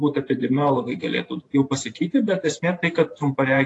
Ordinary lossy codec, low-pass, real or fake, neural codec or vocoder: AAC, 48 kbps; 10.8 kHz; fake; vocoder, 44.1 kHz, 128 mel bands every 512 samples, BigVGAN v2